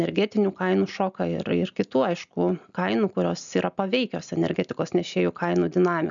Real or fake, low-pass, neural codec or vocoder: real; 7.2 kHz; none